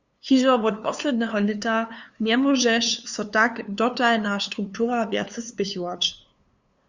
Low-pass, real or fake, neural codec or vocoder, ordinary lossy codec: 7.2 kHz; fake; codec, 16 kHz, 2 kbps, FunCodec, trained on LibriTTS, 25 frames a second; Opus, 64 kbps